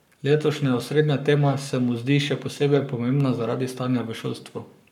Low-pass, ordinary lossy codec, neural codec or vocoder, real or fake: 19.8 kHz; none; codec, 44.1 kHz, 7.8 kbps, Pupu-Codec; fake